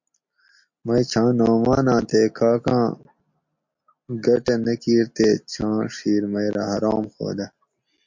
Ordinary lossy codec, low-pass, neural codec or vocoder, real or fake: MP3, 48 kbps; 7.2 kHz; none; real